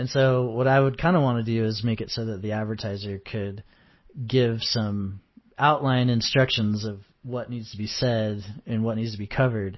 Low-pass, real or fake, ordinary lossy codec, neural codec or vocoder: 7.2 kHz; real; MP3, 24 kbps; none